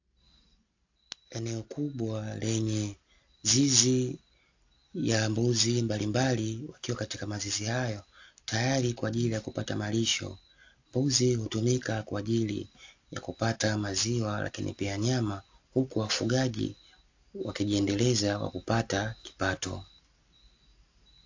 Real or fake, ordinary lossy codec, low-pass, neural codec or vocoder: real; AAC, 48 kbps; 7.2 kHz; none